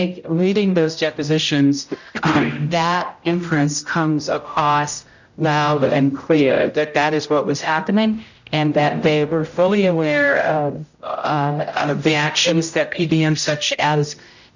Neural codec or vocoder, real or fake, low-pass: codec, 16 kHz, 0.5 kbps, X-Codec, HuBERT features, trained on general audio; fake; 7.2 kHz